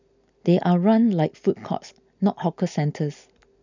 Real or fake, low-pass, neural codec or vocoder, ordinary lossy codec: real; 7.2 kHz; none; none